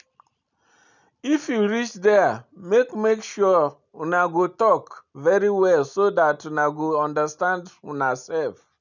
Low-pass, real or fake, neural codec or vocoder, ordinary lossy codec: 7.2 kHz; real; none; none